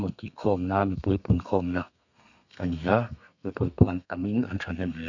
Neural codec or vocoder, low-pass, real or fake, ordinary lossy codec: codec, 32 kHz, 1.9 kbps, SNAC; 7.2 kHz; fake; none